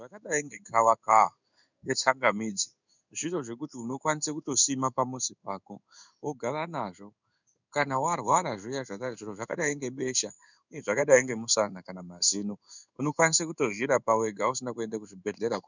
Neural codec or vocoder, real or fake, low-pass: codec, 16 kHz in and 24 kHz out, 1 kbps, XY-Tokenizer; fake; 7.2 kHz